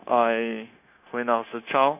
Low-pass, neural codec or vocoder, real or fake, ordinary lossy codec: 3.6 kHz; codec, 16 kHz, 0.9 kbps, LongCat-Audio-Codec; fake; none